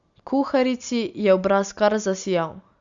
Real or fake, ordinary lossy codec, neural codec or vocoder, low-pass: real; Opus, 64 kbps; none; 7.2 kHz